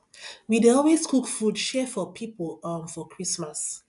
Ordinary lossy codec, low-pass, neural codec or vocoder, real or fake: AAC, 96 kbps; 10.8 kHz; none; real